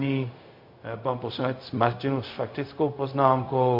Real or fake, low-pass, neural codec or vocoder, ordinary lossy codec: fake; 5.4 kHz; codec, 16 kHz, 0.4 kbps, LongCat-Audio-Codec; MP3, 32 kbps